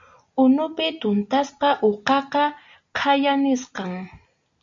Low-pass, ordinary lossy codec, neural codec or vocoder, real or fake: 7.2 kHz; AAC, 64 kbps; none; real